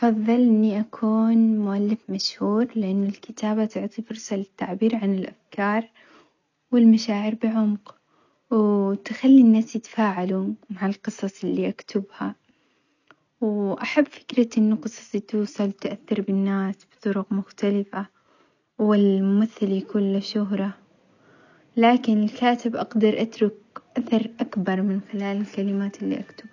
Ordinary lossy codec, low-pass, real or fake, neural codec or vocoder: none; 7.2 kHz; real; none